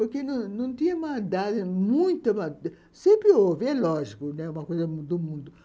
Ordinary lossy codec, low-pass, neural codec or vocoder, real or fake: none; none; none; real